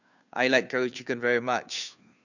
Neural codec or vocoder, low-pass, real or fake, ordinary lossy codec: codec, 16 kHz, 2 kbps, FunCodec, trained on Chinese and English, 25 frames a second; 7.2 kHz; fake; none